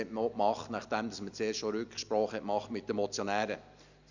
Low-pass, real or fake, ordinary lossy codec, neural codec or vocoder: 7.2 kHz; real; none; none